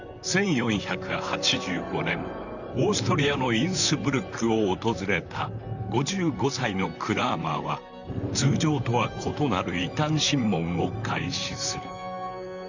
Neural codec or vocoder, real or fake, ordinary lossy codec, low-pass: vocoder, 44.1 kHz, 128 mel bands, Pupu-Vocoder; fake; none; 7.2 kHz